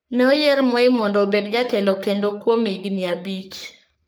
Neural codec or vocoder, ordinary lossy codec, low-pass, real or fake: codec, 44.1 kHz, 3.4 kbps, Pupu-Codec; none; none; fake